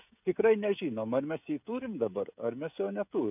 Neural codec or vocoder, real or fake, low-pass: codec, 16 kHz, 16 kbps, FreqCodec, smaller model; fake; 3.6 kHz